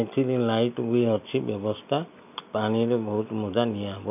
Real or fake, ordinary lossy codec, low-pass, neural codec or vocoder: real; none; 3.6 kHz; none